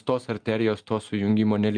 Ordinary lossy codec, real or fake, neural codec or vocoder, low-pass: Opus, 32 kbps; real; none; 9.9 kHz